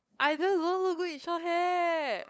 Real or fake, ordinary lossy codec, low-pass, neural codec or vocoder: fake; none; none; codec, 16 kHz, 8 kbps, FunCodec, trained on LibriTTS, 25 frames a second